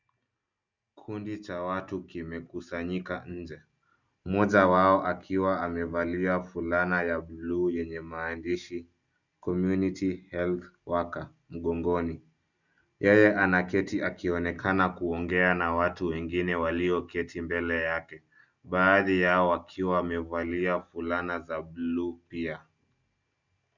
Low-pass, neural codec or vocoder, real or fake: 7.2 kHz; none; real